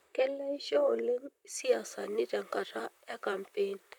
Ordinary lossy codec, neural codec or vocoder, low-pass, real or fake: none; vocoder, 44.1 kHz, 128 mel bands, Pupu-Vocoder; 19.8 kHz; fake